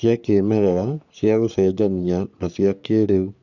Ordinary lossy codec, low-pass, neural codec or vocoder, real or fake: none; 7.2 kHz; codec, 44.1 kHz, 3.4 kbps, Pupu-Codec; fake